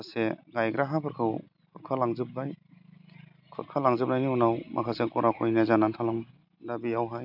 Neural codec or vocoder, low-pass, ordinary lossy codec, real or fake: none; 5.4 kHz; none; real